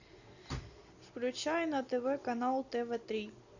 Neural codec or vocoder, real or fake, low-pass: none; real; 7.2 kHz